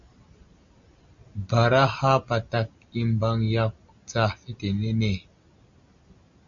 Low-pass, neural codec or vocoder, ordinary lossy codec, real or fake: 7.2 kHz; none; Opus, 64 kbps; real